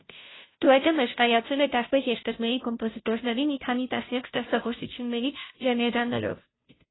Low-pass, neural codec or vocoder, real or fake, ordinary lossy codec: 7.2 kHz; codec, 16 kHz, 0.5 kbps, FunCodec, trained on Chinese and English, 25 frames a second; fake; AAC, 16 kbps